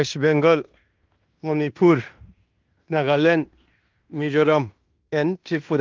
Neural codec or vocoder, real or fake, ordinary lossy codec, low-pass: codec, 16 kHz in and 24 kHz out, 0.9 kbps, LongCat-Audio-Codec, fine tuned four codebook decoder; fake; Opus, 32 kbps; 7.2 kHz